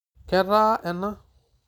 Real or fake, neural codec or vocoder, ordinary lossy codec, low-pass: real; none; none; 19.8 kHz